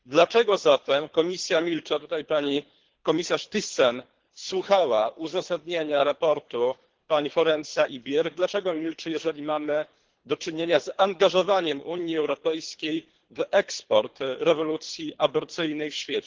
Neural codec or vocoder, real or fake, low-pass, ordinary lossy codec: codec, 24 kHz, 3 kbps, HILCodec; fake; 7.2 kHz; Opus, 16 kbps